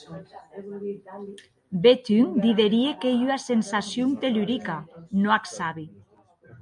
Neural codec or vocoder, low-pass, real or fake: none; 10.8 kHz; real